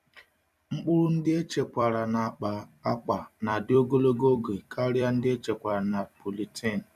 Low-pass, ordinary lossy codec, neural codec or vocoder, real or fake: 14.4 kHz; none; vocoder, 44.1 kHz, 128 mel bands every 256 samples, BigVGAN v2; fake